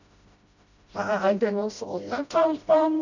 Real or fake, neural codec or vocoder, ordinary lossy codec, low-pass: fake; codec, 16 kHz, 0.5 kbps, FreqCodec, smaller model; none; 7.2 kHz